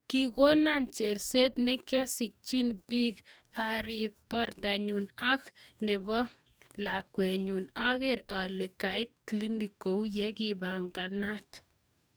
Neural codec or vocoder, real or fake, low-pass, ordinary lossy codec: codec, 44.1 kHz, 2.6 kbps, DAC; fake; none; none